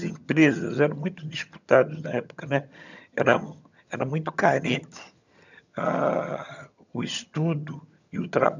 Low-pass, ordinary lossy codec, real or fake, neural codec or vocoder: 7.2 kHz; none; fake; vocoder, 22.05 kHz, 80 mel bands, HiFi-GAN